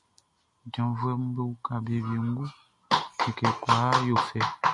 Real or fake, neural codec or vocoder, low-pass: real; none; 10.8 kHz